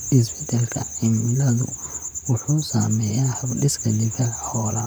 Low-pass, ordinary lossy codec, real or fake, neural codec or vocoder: none; none; fake; vocoder, 44.1 kHz, 128 mel bands, Pupu-Vocoder